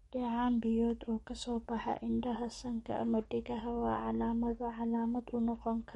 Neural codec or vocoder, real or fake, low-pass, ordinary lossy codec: codec, 44.1 kHz, 7.8 kbps, DAC; fake; 19.8 kHz; MP3, 48 kbps